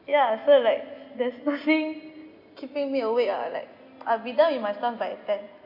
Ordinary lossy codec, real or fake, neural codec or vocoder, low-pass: none; real; none; 5.4 kHz